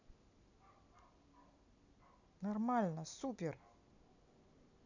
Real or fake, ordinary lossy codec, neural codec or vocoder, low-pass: real; none; none; 7.2 kHz